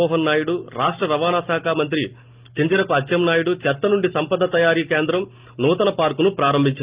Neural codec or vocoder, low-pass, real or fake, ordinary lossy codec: none; 3.6 kHz; real; Opus, 32 kbps